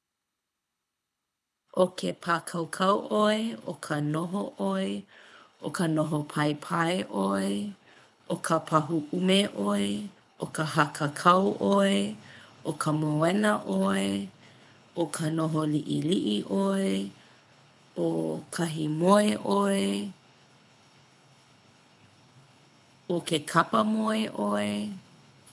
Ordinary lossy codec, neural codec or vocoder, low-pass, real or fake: none; codec, 24 kHz, 6 kbps, HILCodec; none; fake